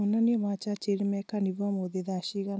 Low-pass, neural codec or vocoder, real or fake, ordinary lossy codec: none; none; real; none